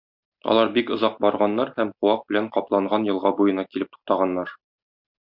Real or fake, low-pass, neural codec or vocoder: real; 5.4 kHz; none